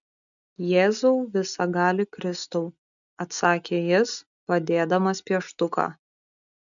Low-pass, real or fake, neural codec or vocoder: 7.2 kHz; real; none